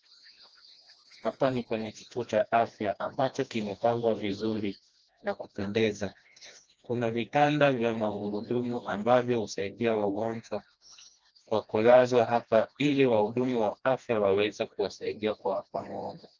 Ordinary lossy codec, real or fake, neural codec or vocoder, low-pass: Opus, 24 kbps; fake; codec, 16 kHz, 1 kbps, FreqCodec, smaller model; 7.2 kHz